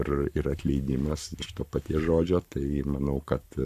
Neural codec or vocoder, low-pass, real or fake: codec, 44.1 kHz, 7.8 kbps, Pupu-Codec; 14.4 kHz; fake